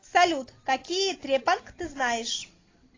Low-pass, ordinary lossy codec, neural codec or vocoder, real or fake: 7.2 kHz; AAC, 32 kbps; none; real